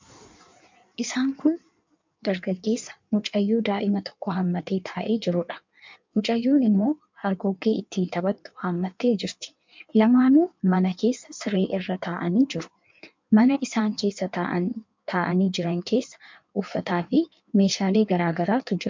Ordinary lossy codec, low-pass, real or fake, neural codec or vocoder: MP3, 64 kbps; 7.2 kHz; fake; codec, 16 kHz in and 24 kHz out, 1.1 kbps, FireRedTTS-2 codec